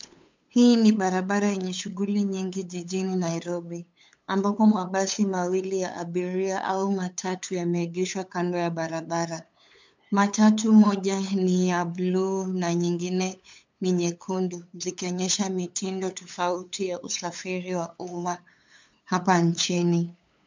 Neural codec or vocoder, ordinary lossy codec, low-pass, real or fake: codec, 16 kHz, 8 kbps, FunCodec, trained on LibriTTS, 25 frames a second; MP3, 64 kbps; 7.2 kHz; fake